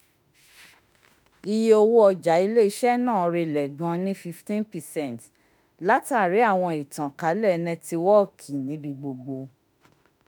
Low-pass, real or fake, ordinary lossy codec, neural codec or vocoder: none; fake; none; autoencoder, 48 kHz, 32 numbers a frame, DAC-VAE, trained on Japanese speech